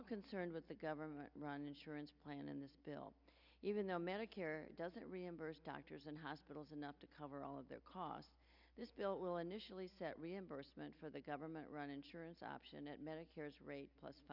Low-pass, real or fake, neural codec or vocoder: 5.4 kHz; real; none